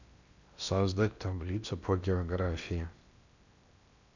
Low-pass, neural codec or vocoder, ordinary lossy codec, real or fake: 7.2 kHz; codec, 16 kHz in and 24 kHz out, 0.8 kbps, FocalCodec, streaming, 65536 codes; none; fake